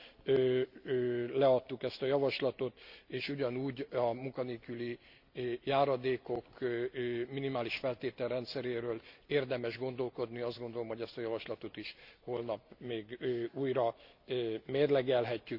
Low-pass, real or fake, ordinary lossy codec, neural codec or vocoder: 5.4 kHz; real; Opus, 64 kbps; none